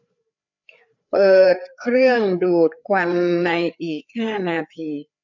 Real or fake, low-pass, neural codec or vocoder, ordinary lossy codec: fake; 7.2 kHz; codec, 16 kHz, 4 kbps, FreqCodec, larger model; none